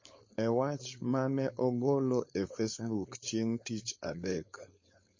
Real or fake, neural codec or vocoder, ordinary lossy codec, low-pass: fake; codec, 16 kHz, 4.8 kbps, FACodec; MP3, 32 kbps; 7.2 kHz